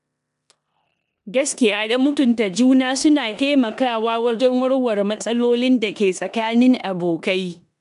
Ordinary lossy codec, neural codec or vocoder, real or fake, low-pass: none; codec, 16 kHz in and 24 kHz out, 0.9 kbps, LongCat-Audio-Codec, four codebook decoder; fake; 10.8 kHz